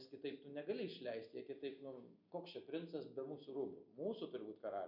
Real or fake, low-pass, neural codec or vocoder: real; 5.4 kHz; none